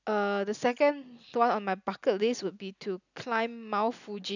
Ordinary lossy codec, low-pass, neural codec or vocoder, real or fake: none; 7.2 kHz; none; real